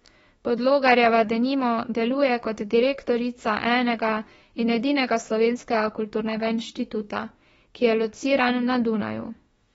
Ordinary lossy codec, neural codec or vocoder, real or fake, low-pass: AAC, 24 kbps; autoencoder, 48 kHz, 32 numbers a frame, DAC-VAE, trained on Japanese speech; fake; 19.8 kHz